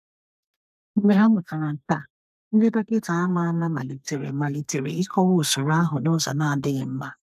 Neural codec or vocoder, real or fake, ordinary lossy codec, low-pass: codec, 32 kHz, 1.9 kbps, SNAC; fake; none; 14.4 kHz